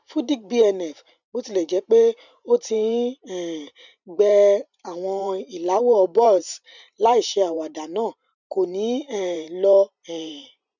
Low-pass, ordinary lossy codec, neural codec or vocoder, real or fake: 7.2 kHz; none; vocoder, 44.1 kHz, 128 mel bands every 512 samples, BigVGAN v2; fake